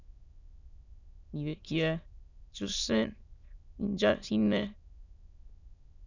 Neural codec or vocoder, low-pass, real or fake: autoencoder, 22.05 kHz, a latent of 192 numbers a frame, VITS, trained on many speakers; 7.2 kHz; fake